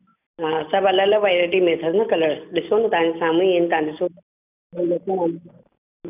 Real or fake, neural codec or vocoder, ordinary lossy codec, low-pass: real; none; none; 3.6 kHz